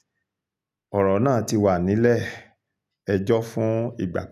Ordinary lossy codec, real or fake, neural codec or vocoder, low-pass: none; real; none; 14.4 kHz